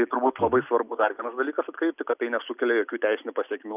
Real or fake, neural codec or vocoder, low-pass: real; none; 3.6 kHz